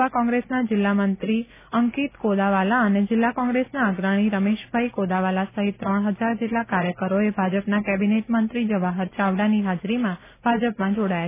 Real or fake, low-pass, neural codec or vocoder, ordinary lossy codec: real; 3.6 kHz; none; none